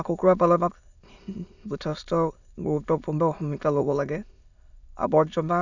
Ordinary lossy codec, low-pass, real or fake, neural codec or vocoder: none; 7.2 kHz; fake; autoencoder, 22.05 kHz, a latent of 192 numbers a frame, VITS, trained on many speakers